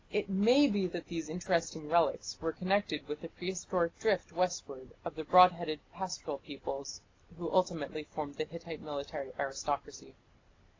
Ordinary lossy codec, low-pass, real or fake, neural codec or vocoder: AAC, 32 kbps; 7.2 kHz; real; none